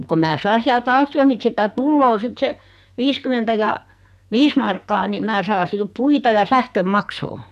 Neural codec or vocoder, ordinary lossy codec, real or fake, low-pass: codec, 44.1 kHz, 2.6 kbps, SNAC; AAC, 96 kbps; fake; 14.4 kHz